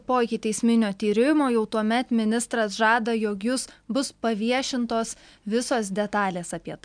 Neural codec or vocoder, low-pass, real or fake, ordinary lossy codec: none; 9.9 kHz; real; MP3, 96 kbps